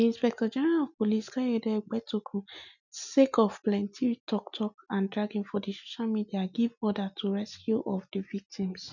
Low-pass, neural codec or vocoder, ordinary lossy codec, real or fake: 7.2 kHz; none; none; real